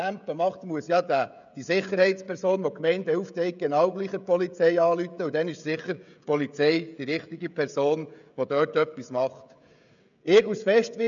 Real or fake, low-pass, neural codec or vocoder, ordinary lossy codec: fake; 7.2 kHz; codec, 16 kHz, 16 kbps, FreqCodec, smaller model; none